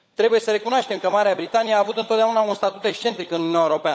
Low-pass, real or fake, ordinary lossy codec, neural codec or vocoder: none; fake; none; codec, 16 kHz, 16 kbps, FunCodec, trained on LibriTTS, 50 frames a second